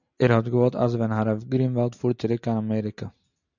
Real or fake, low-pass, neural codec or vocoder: real; 7.2 kHz; none